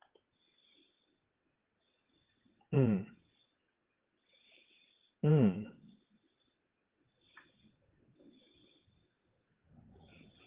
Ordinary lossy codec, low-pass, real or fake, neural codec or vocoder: Opus, 16 kbps; 3.6 kHz; fake; vocoder, 22.05 kHz, 80 mel bands, WaveNeXt